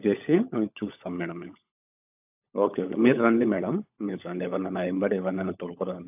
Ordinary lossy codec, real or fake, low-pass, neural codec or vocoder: AAC, 32 kbps; fake; 3.6 kHz; codec, 16 kHz, 16 kbps, FunCodec, trained on LibriTTS, 50 frames a second